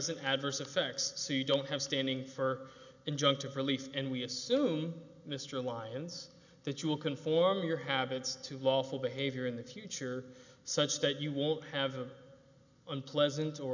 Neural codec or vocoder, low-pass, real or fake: none; 7.2 kHz; real